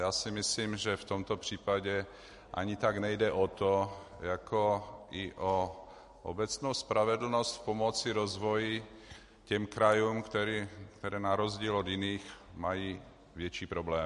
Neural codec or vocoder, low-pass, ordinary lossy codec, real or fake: none; 14.4 kHz; MP3, 48 kbps; real